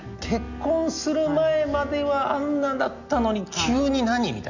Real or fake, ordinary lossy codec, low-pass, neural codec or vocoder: real; none; 7.2 kHz; none